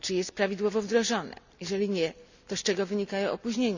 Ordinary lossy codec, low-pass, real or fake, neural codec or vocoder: none; 7.2 kHz; real; none